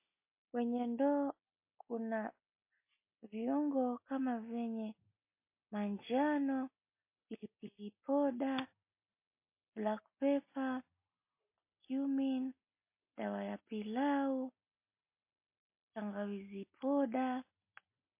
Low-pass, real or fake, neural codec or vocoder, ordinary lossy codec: 3.6 kHz; real; none; MP3, 24 kbps